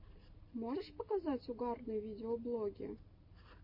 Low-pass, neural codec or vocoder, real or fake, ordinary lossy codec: 5.4 kHz; none; real; MP3, 32 kbps